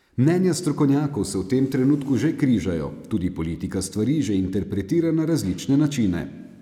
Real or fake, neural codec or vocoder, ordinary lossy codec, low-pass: real; none; none; 19.8 kHz